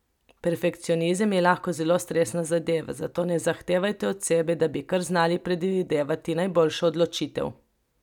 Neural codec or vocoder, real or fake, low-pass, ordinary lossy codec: none; real; 19.8 kHz; none